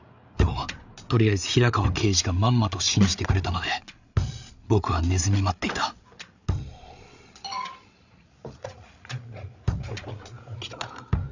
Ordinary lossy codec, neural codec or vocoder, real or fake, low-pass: none; codec, 16 kHz, 8 kbps, FreqCodec, larger model; fake; 7.2 kHz